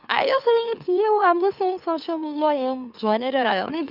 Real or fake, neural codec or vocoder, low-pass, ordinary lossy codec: fake; autoencoder, 44.1 kHz, a latent of 192 numbers a frame, MeloTTS; 5.4 kHz; none